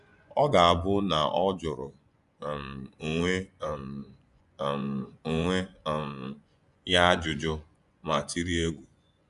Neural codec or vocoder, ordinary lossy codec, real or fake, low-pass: vocoder, 24 kHz, 100 mel bands, Vocos; none; fake; 10.8 kHz